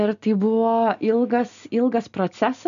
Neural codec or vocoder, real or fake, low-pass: none; real; 7.2 kHz